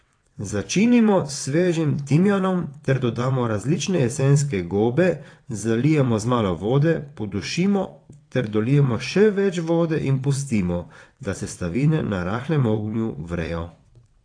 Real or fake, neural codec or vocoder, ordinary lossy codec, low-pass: fake; vocoder, 22.05 kHz, 80 mel bands, WaveNeXt; AAC, 48 kbps; 9.9 kHz